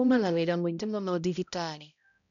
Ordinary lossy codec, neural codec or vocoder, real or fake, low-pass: none; codec, 16 kHz, 0.5 kbps, X-Codec, HuBERT features, trained on balanced general audio; fake; 7.2 kHz